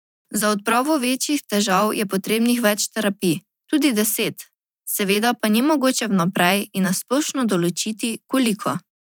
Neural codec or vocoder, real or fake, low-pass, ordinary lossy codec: vocoder, 44.1 kHz, 128 mel bands every 512 samples, BigVGAN v2; fake; none; none